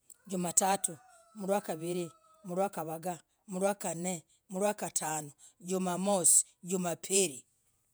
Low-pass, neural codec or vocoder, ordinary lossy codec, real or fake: none; vocoder, 48 kHz, 128 mel bands, Vocos; none; fake